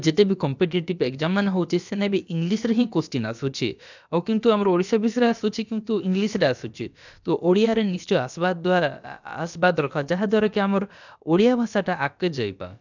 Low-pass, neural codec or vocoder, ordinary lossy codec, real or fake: 7.2 kHz; codec, 16 kHz, about 1 kbps, DyCAST, with the encoder's durations; none; fake